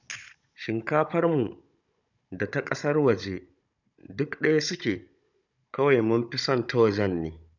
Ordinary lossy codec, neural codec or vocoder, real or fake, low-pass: none; codec, 16 kHz, 16 kbps, FunCodec, trained on Chinese and English, 50 frames a second; fake; 7.2 kHz